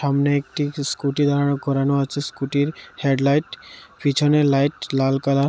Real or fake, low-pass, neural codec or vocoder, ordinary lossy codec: real; none; none; none